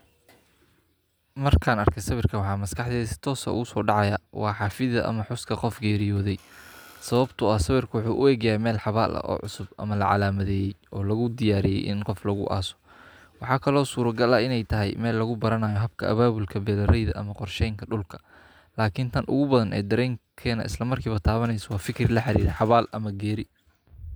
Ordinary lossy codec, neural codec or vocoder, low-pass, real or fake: none; none; none; real